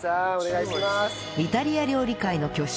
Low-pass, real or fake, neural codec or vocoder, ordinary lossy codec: none; real; none; none